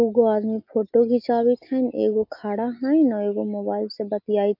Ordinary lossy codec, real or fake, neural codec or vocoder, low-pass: AAC, 48 kbps; real; none; 5.4 kHz